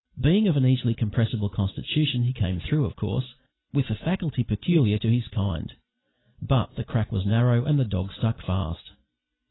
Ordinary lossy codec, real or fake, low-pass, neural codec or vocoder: AAC, 16 kbps; real; 7.2 kHz; none